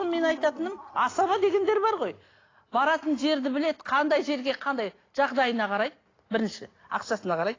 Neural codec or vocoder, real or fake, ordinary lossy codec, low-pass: none; real; AAC, 32 kbps; 7.2 kHz